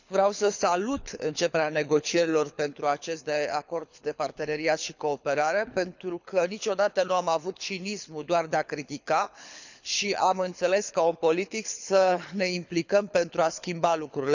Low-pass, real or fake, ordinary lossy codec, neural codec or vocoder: 7.2 kHz; fake; none; codec, 24 kHz, 6 kbps, HILCodec